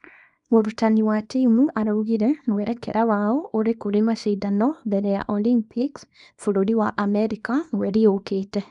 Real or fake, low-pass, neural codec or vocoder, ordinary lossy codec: fake; 10.8 kHz; codec, 24 kHz, 0.9 kbps, WavTokenizer, small release; none